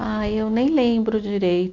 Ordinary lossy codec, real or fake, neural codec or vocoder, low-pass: none; real; none; 7.2 kHz